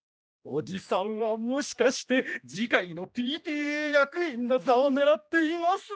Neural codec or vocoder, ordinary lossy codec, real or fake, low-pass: codec, 16 kHz, 1 kbps, X-Codec, HuBERT features, trained on general audio; none; fake; none